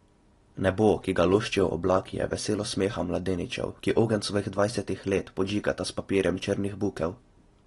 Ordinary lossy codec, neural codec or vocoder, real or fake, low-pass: AAC, 32 kbps; none; real; 10.8 kHz